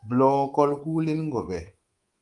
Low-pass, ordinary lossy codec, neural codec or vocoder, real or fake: 10.8 kHz; Opus, 32 kbps; codec, 24 kHz, 3.1 kbps, DualCodec; fake